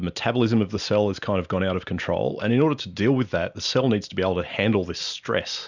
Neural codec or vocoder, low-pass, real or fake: none; 7.2 kHz; real